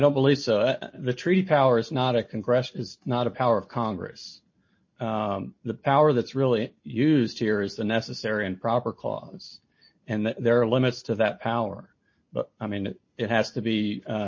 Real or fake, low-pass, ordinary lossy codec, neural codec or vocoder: real; 7.2 kHz; MP3, 32 kbps; none